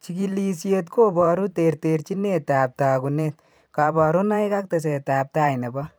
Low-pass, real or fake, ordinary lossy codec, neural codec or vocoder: none; fake; none; vocoder, 44.1 kHz, 128 mel bands every 512 samples, BigVGAN v2